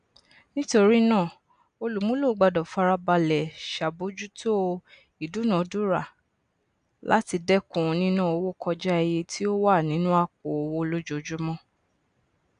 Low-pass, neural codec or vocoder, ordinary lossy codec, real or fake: 9.9 kHz; none; none; real